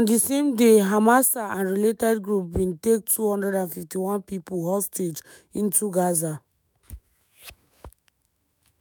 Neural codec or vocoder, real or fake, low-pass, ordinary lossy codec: autoencoder, 48 kHz, 128 numbers a frame, DAC-VAE, trained on Japanese speech; fake; none; none